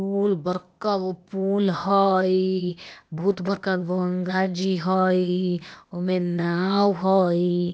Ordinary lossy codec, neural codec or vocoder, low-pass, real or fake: none; codec, 16 kHz, 0.8 kbps, ZipCodec; none; fake